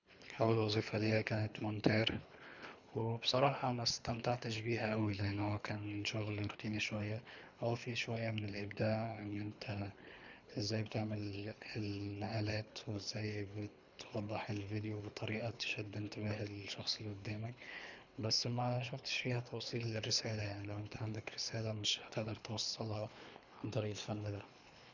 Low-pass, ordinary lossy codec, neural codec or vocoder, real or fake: 7.2 kHz; none; codec, 24 kHz, 3 kbps, HILCodec; fake